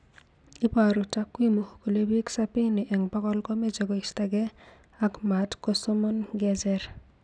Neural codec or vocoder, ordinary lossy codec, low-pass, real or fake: none; none; 9.9 kHz; real